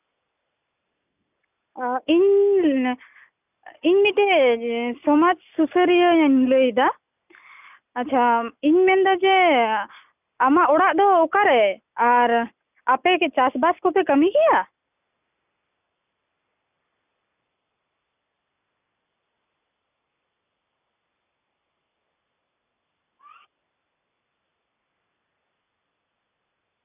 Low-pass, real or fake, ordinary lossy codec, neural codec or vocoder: 3.6 kHz; real; none; none